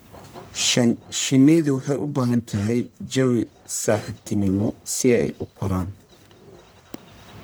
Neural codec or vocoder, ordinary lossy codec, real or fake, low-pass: codec, 44.1 kHz, 1.7 kbps, Pupu-Codec; none; fake; none